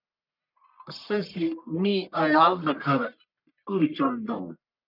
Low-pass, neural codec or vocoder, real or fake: 5.4 kHz; codec, 44.1 kHz, 1.7 kbps, Pupu-Codec; fake